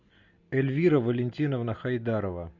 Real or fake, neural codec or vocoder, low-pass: real; none; 7.2 kHz